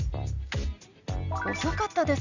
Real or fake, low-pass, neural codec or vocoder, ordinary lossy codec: real; 7.2 kHz; none; none